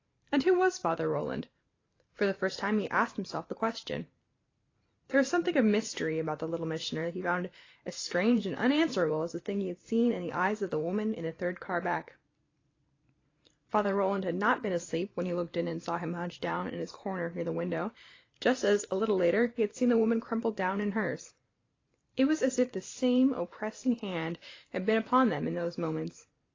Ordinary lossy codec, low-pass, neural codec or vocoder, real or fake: AAC, 32 kbps; 7.2 kHz; vocoder, 44.1 kHz, 128 mel bands every 512 samples, BigVGAN v2; fake